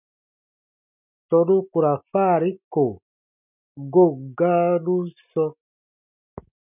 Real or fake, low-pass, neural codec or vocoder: real; 3.6 kHz; none